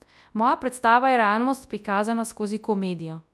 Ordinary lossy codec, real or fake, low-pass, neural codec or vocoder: none; fake; none; codec, 24 kHz, 0.9 kbps, WavTokenizer, large speech release